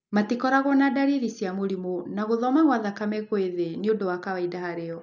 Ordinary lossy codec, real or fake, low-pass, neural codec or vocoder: none; real; 7.2 kHz; none